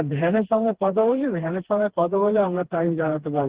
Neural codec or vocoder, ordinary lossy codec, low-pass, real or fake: codec, 16 kHz, 2 kbps, FreqCodec, smaller model; Opus, 16 kbps; 3.6 kHz; fake